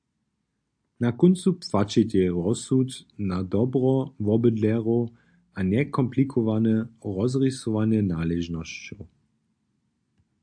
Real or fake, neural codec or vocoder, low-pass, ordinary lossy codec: real; none; 9.9 kHz; AAC, 64 kbps